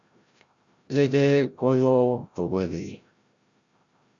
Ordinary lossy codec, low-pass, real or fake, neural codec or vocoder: Opus, 64 kbps; 7.2 kHz; fake; codec, 16 kHz, 0.5 kbps, FreqCodec, larger model